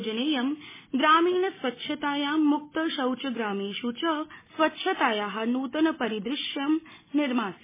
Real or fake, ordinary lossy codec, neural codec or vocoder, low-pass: real; MP3, 16 kbps; none; 3.6 kHz